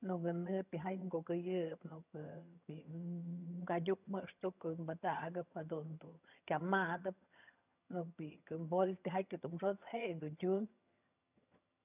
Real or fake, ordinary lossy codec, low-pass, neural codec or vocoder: fake; none; 3.6 kHz; vocoder, 22.05 kHz, 80 mel bands, HiFi-GAN